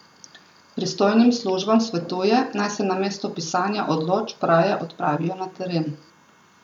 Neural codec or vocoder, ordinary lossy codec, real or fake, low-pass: none; none; real; 19.8 kHz